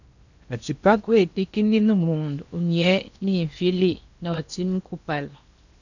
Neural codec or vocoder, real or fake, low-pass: codec, 16 kHz in and 24 kHz out, 0.8 kbps, FocalCodec, streaming, 65536 codes; fake; 7.2 kHz